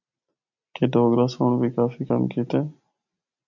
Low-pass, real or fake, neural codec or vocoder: 7.2 kHz; real; none